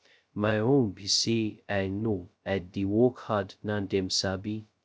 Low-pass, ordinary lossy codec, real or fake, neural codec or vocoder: none; none; fake; codec, 16 kHz, 0.2 kbps, FocalCodec